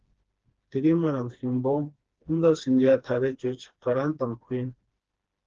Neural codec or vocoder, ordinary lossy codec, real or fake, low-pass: codec, 16 kHz, 2 kbps, FreqCodec, smaller model; Opus, 16 kbps; fake; 7.2 kHz